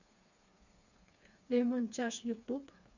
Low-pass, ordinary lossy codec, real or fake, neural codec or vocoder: 7.2 kHz; none; fake; codec, 16 kHz, 4 kbps, FreqCodec, smaller model